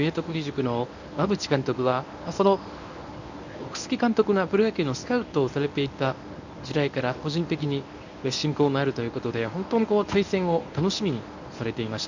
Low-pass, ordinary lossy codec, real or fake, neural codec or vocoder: 7.2 kHz; none; fake; codec, 24 kHz, 0.9 kbps, WavTokenizer, medium speech release version 1